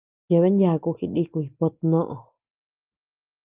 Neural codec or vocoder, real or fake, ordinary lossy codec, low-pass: none; real; Opus, 24 kbps; 3.6 kHz